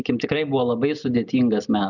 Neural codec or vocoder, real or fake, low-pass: none; real; 7.2 kHz